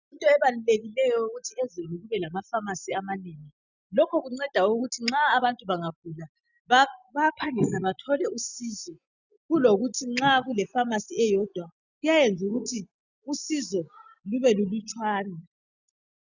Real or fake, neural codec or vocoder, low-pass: real; none; 7.2 kHz